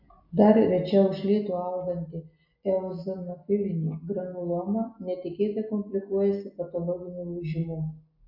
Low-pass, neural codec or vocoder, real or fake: 5.4 kHz; none; real